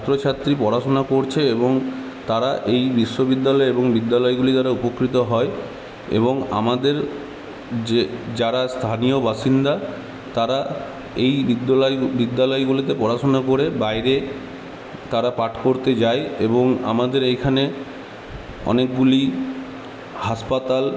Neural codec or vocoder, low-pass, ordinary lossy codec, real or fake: none; none; none; real